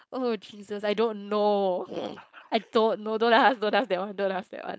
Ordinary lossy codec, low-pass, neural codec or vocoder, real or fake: none; none; codec, 16 kHz, 4.8 kbps, FACodec; fake